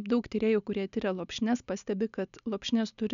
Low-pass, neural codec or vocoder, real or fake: 7.2 kHz; none; real